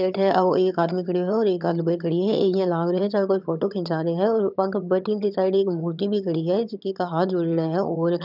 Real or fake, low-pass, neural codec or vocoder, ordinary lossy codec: fake; 5.4 kHz; vocoder, 22.05 kHz, 80 mel bands, HiFi-GAN; none